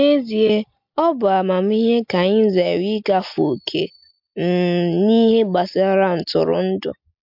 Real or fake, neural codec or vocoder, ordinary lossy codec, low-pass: real; none; MP3, 48 kbps; 5.4 kHz